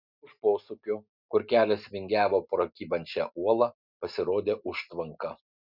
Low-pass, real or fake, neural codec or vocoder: 5.4 kHz; real; none